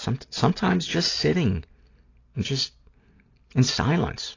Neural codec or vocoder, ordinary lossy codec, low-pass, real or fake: none; AAC, 32 kbps; 7.2 kHz; real